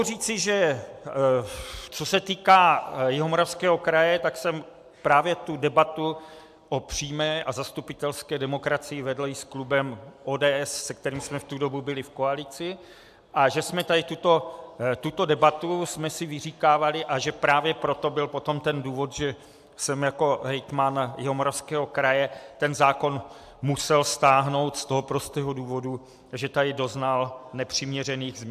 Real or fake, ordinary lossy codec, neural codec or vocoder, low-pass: real; AAC, 96 kbps; none; 14.4 kHz